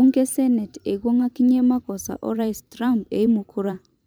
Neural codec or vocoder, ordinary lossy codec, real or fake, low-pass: vocoder, 44.1 kHz, 128 mel bands every 512 samples, BigVGAN v2; none; fake; none